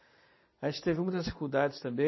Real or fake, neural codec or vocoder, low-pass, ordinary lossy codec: real; none; 7.2 kHz; MP3, 24 kbps